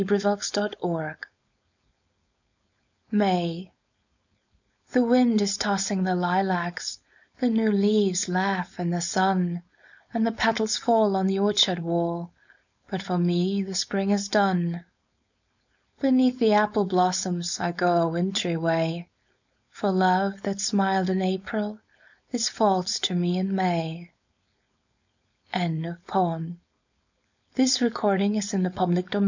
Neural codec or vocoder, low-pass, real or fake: codec, 16 kHz, 4.8 kbps, FACodec; 7.2 kHz; fake